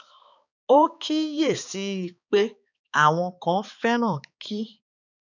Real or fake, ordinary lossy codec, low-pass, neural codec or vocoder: fake; none; 7.2 kHz; codec, 16 kHz, 4 kbps, X-Codec, HuBERT features, trained on balanced general audio